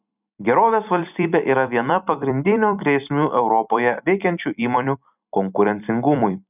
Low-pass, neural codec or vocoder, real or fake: 3.6 kHz; vocoder, 44.1 kHz, 128 mel bands every 256 samples, BigVGAN v2; fake